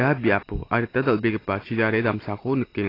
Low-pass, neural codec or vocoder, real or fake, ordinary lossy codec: 5.4 kHz; none; real; AAC, 24 kbps